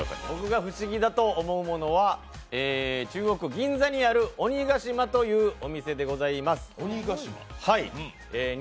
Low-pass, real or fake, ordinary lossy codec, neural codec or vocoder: none; real; none; none